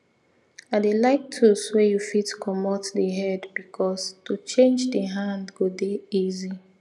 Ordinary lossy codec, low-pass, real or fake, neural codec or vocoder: none; none; real; none